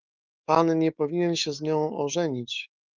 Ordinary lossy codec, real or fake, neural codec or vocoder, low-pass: Opus, 24 kbps; fake; autoencoder, 48 kHz, 128 numbers a frame, DAC-VAE, trained on Japanese speech; 7.2 kHz